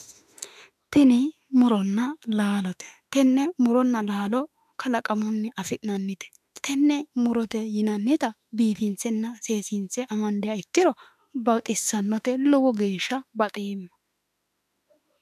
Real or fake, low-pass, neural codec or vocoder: fake; 14.4 kHz; autoencoder, 48 kHz, 32 numbers a frame, DAC-VAE, trained on Japanese speech